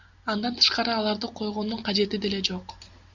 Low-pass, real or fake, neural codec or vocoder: 7.2 kHz; real; none